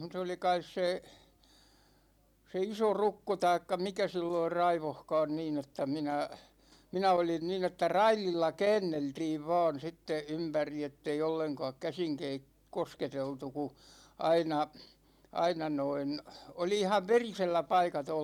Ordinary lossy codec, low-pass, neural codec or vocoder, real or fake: none; 19.8 kHz; none; real